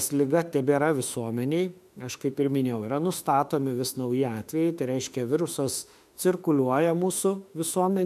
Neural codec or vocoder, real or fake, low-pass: autoencoder, 48 kHz, 32 numbers a frame, DAC-VAE, trained on Japanese speech; fake; 14.4 kHz